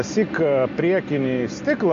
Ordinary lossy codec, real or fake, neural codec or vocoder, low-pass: MP3, 48 kbps; real; none; 7.2 kHz